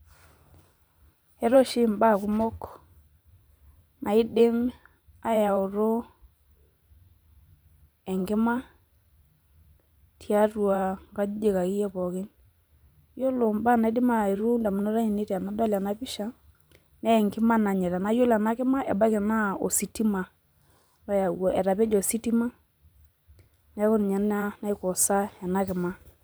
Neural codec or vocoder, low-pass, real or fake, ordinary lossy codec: vocoder, 44.1 kHz, 128 mel bands every 512 samples, BigVGAN v2; none; fake; none